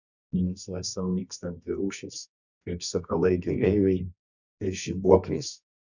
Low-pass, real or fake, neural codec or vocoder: 7.2 kHz; fake; codec, 24 kHz, 0.9 kbps, WavTokenizer, medium music audio release